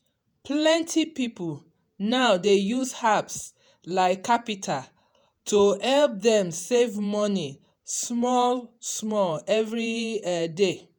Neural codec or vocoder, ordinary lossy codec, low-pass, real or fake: vocoder, 48 kHz, 128 mel bands, Vocos; none; none; fake